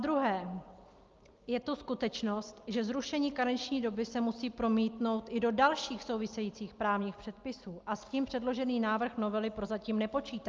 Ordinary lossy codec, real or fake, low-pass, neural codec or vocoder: Opus, 24 kbps; real; 7.2 kHz; none